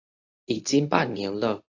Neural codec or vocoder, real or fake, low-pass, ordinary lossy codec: codec, 24 kHz, 0.9 kbps, WavTokenizer, medium speech release version 1; fake; 7.2 kHz; AAC, 48 kbps